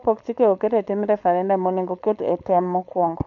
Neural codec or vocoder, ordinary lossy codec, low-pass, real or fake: codec, 16 kHz, 4.8 kbps, FACodec; none; 7.2 kHz; fake